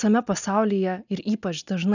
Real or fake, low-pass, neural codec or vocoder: real; 7.2 kHz; none